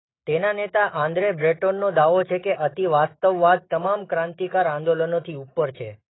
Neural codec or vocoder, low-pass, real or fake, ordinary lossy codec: autoencoder, 48 kHz, 128 numbers a frame, DAC-VAE, trained on Japanese speech; 7.2 kHz; fake; AAC, 16 kbps